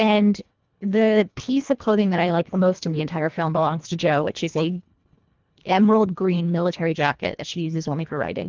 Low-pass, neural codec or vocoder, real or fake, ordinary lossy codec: 7.2 kHz; codec, 24 kHz, 1.5 kbps, HILCodec; fake; Opus, 32 kbps